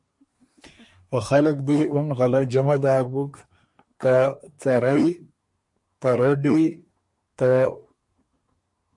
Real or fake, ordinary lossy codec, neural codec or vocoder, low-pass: fake; MP3, 48 kbps; codec, 24 kHz, 1 kbps, SNAC; 10.8 kHz